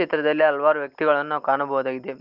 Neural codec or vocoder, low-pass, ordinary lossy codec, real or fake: none; 5.4 kHz; Opus, 32 kbps; real